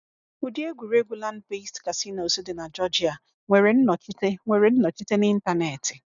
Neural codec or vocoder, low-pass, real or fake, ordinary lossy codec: none; 7.2 kHz; real; none